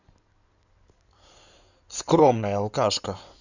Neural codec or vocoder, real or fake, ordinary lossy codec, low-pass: codec, 16 kHz in and 24 kHz out, 2.2 kbps, FireRedTTS-2 codec; fake; none; 7.2 kHz